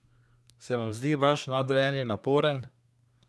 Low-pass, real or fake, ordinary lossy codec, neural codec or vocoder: none; fake; none; codec, 24 kHz, 1 kbps, SNAC